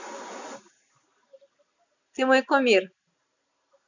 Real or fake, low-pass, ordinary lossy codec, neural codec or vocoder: real; 7.2 kHz; none; none